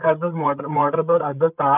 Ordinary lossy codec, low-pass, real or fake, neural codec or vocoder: none; 3.6 kHz; fake; codec, 16 kHz, 8 kbps, FreqCodec, larger model